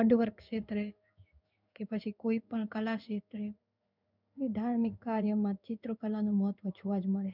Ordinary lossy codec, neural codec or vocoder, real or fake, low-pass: none; codec, 16 kHz in and 24 kHz out, 1 kbps, XY-Tokenizer; fake; 5.4 kHz